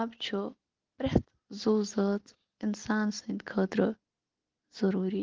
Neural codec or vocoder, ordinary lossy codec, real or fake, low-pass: none; Opus, 16 kbps; real; 7.2 kHz